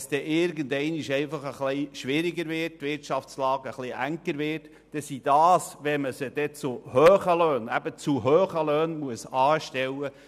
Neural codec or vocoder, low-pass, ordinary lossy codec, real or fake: none; 14.4 kHz; none; real